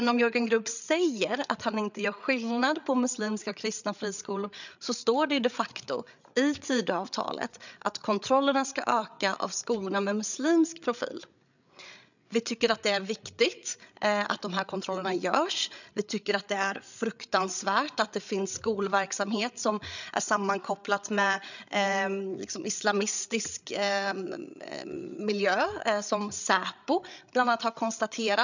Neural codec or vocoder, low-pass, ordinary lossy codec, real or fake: codec, 16 kHz, 8 kbps, FreqCodec, larger model; 7.2 kHz; none; fake